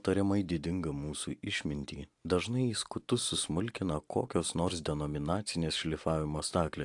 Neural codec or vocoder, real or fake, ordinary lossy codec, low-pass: none; real; AAC, 64 kbps; 10.8 kHz